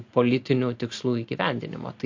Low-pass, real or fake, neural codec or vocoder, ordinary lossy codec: 7.2 kHz; real; none; MP3, 48 kbps